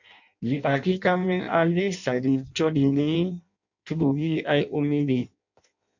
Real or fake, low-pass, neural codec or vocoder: fake; 7.2 kHz; codec, 16 kHz in and 24 kHz out, 0.6 kbps, FireRedTTS-2 codec